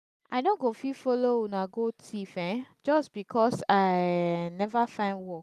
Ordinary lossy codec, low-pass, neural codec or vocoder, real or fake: none; 14.4 kHz; none; real